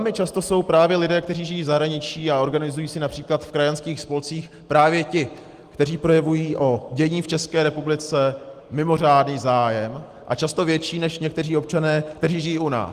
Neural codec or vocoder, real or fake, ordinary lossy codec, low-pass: none; real; Opus, 24 kbps; 14.4 kHz